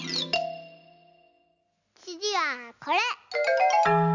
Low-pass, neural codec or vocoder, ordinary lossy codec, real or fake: 7.2 kHz; none; none; real